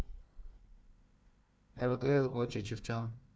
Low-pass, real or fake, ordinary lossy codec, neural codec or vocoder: none; fake; none; codec, 16 kHz, 1 kbps, FunCodec, trained on Chinese and English, 50 frames a second